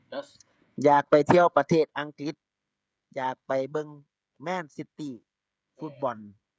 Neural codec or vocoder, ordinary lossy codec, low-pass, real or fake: codec, 16 kHz, 16 kbps, FreqCodec, smaller model; none; none; fake